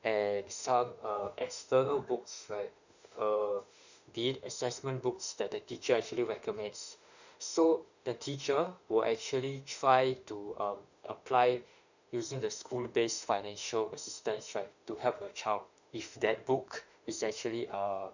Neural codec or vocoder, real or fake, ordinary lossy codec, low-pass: autoencoder, 48 kHz, 32 numbers a frame, DAC-VAE, trained on Japanese speech; fake; none; 7.2 kHz